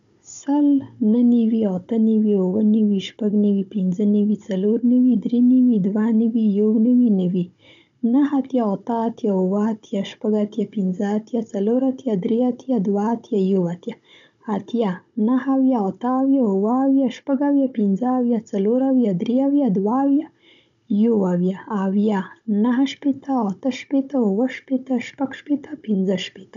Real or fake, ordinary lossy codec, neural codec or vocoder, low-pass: fake; none; codec, 16 kHz, 16 kbps, FunCodec, trained on Chinese and English, 50 frames a second; 7.2 kHz